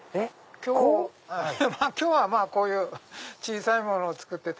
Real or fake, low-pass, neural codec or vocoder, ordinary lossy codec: real; none; none; none